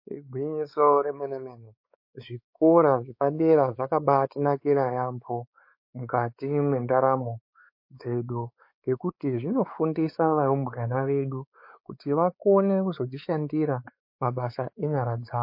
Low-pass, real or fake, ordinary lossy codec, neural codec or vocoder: 5.4 kHz; fake; MP3, 32 kbps; codec, 16 kHz, 4 kbps, X-Codec, WavLM features, trained on Multilingual LibriSpeech